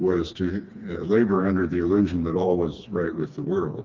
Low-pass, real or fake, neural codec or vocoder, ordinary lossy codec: 7.2 kHz; fake; codec, 16 kHz, 2 kbps, FreqCodec, smaller model; Opus, 24 kbps